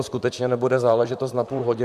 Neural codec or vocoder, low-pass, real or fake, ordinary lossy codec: vocoder, 44.1 kHz, 128 mel bands, Pupu-Vocoder; 14.4 kHz; fake; MP3, 96 kbps